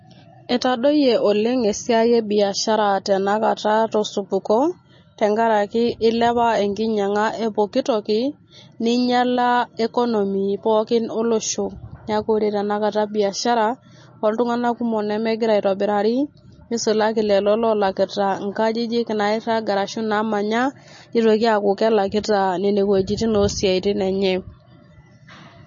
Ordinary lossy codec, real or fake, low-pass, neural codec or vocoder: MP3, 32 kbps; real; 7.2 kHz; none